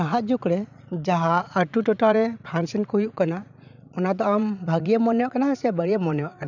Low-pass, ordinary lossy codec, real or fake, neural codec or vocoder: 7.2 kHz; none; fake; vocoder, 44.1 kHz, 80 mel bands, Vocos